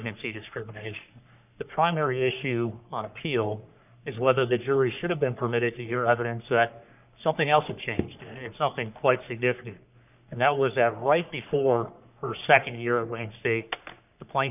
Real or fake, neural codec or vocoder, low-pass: fake; codec, 44.1 kHz, 3.4 kbps, Pupu-Codec; 3.6 kHz